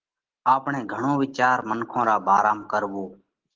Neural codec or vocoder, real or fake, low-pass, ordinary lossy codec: none; real; 7.2 kHz; Opus, 16 kbps